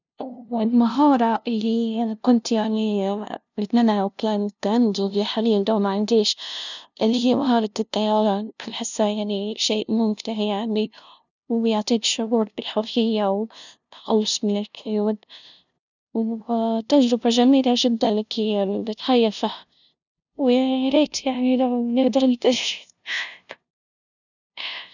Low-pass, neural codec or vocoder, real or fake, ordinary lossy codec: 7.2 kHz; codec, 16 kHz, 0.5 kbps, FunCodec, trained on LibriTTS, 25 frames a second; fake; none